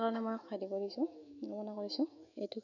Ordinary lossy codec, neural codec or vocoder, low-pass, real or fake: none; autoencoder, 48 kHz, 128 numbers a frame, DAC-VAE, trained on Japanese speech; 7.2 kHz; fake